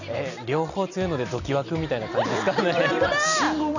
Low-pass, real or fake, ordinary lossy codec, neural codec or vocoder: 7.2 kHz; real; none; none